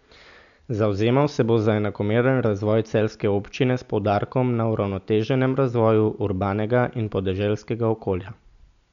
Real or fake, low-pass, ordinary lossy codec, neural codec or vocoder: real; 7.2 kHz; none; none